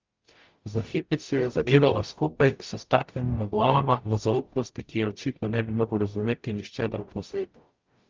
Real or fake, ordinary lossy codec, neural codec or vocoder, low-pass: fake; Opus, 32 kbps; codec, 44.1 kHz, 0.9 kbps, DAC; 7.2 kHz